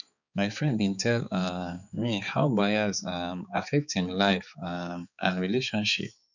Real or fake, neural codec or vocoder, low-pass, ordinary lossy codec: fake; codec, 16 kHz, 4 kbps, X-Codec, HuBERT features, trained on balanced general audio; 7.2 kHz; none